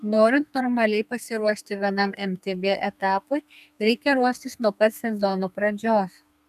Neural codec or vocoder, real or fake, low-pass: codec, 32 kHz, 1.9 kbps, SNAC; fake; 14.4 kHz